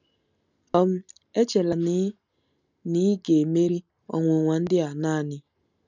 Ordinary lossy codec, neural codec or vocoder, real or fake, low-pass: none; none; real; 7.2 kHz